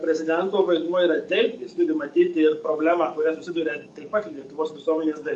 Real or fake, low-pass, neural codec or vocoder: fake; 10.8 kHz; codec, 44.1 kHz, 7.8 kbps, DAC